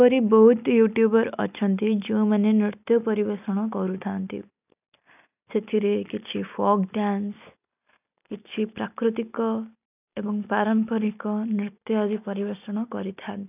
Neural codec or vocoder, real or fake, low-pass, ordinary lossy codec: none; real; 3.6 kHz; none